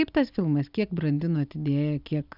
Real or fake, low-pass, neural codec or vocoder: real; 5.4 kHz; none